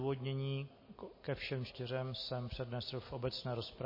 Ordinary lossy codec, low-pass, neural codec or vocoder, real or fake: MP3, 24 kbps; 5.4 kHz; none; real